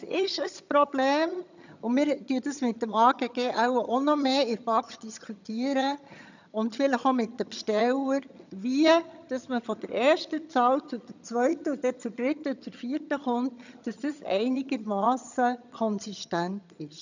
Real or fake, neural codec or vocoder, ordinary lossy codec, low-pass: fake; vocoder, 22.05 kHz, 80 mel bands, HiFi-GAN; none; 7.2 kHz